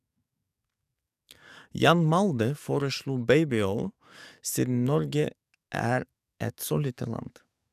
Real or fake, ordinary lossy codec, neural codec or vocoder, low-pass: fake; none; codec, 44.1 kHz, 7.8 kbps, DAC; 14.4 kHz